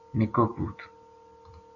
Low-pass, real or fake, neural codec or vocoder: 7.2 kHz; real; none